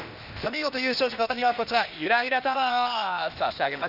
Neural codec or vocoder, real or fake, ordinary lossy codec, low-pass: codec, 16 kHz, 0.8 kbps, ZipCodec; fake; none; 5.4 kHz